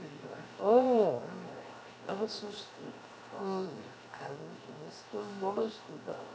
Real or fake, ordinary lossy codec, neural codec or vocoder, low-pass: fake; none; codec, 16 kHz, 0.7 kbps, FocalCodec; none